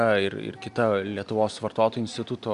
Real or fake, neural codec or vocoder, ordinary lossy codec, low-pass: real; none; Opus, 64 kbps; 10.8 kHz